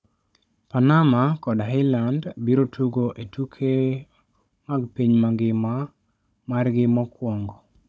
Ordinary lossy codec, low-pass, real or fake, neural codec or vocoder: none; none; fake; codec, 16 kHz, 16 kbps, FunCodec, trained on Chinese and English, 50 frames a second